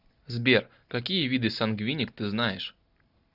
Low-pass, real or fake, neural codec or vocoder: 5.4 kHz; real; none